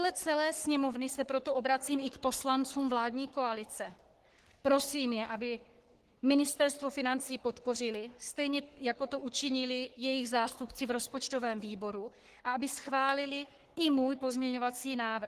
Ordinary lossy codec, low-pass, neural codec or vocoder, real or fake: Opus, 16 kbps; 14.4 kHz; codec, 44.1 kHz, 3.4 kbps, Pupu-Codec; fake